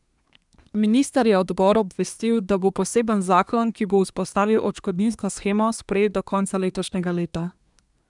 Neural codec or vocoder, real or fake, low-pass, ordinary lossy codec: codec, 24 kHz, 1 kbps, SNAC; fake; 10.8 kHz; none